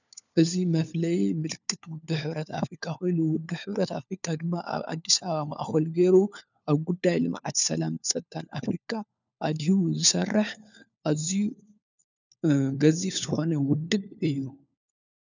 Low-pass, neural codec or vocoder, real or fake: 7.2 kHz; codec, 16 kHz, 4 kbps, FunCodec, trained on LibriTTS, 50 frames a second; fake